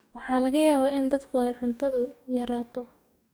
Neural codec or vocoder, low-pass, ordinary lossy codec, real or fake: codec, 44.1 kHz, 2.6 kbps, DAC; none; none; fake